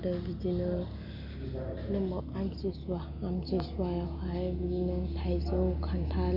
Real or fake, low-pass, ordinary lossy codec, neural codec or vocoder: real; 5.4 kHz; none; none